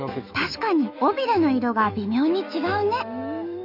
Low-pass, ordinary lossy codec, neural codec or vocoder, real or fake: 5.4 kHz; none; autoencoder, 48 kHz, 128 numbers a frame, DAC-VAE, trained on Japanese speech; fake